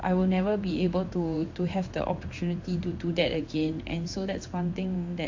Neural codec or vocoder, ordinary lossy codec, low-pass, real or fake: codec, 16 kHz in and 24 kHz out, 1 kbps, XY-Tokenizer; AAC, 48 kbps; 7.2 kHz; fake